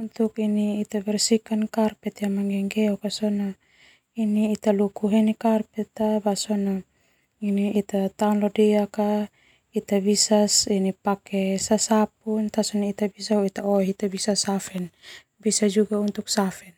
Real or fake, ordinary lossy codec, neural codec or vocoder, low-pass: real; none; none; 19.8 kHz